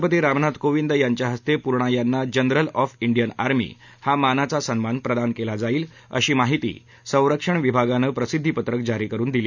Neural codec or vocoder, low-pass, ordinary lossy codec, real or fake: none; 7.2 kHz; none; real